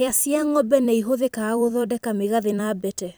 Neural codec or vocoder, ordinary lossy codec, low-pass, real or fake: vocoder, 44.1 kHz, 128 mel bands every 256 samples, BigVGAN v2; none; none; fake